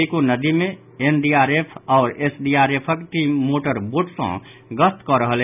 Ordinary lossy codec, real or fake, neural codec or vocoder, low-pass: none; real; none; 3.6 kHz